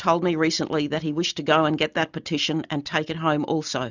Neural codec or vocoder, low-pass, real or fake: none; 7.2 kHz; real